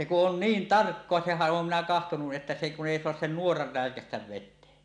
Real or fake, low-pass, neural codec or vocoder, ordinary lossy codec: real; 9.9 kHz; none; none